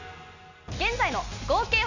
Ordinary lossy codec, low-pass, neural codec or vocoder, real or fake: none; 7.2 kHz; none; real